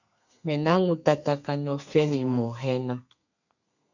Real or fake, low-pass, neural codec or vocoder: fake; 7.2 kHz; codec, 32 kHz, 1.9 kbps, SNAC